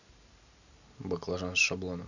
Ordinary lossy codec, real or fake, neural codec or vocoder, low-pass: none; real; none; 7.2 kHz